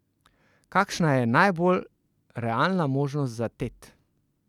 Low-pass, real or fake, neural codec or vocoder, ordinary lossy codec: 19.8 kHz; real; none; none